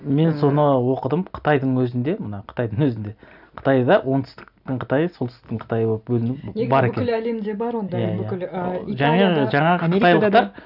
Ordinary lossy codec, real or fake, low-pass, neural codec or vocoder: none; real; 5.4 kHz; none